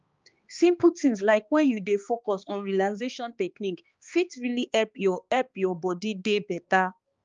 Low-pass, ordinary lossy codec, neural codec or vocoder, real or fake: 7.2 kHz; Opus, 24 kbps; codec, 16 kHz, 2 kbps, X-Codec, HuBERT features, trained on balanced general audio; fake